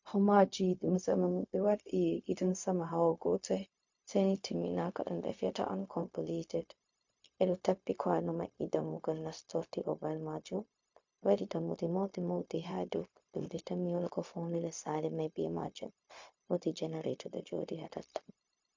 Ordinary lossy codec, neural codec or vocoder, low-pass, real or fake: MP3, 48 kbps; codec, 16 kHz, 0.4 kbps, LongCat-Audio-Codec; 7.2 kHz; fake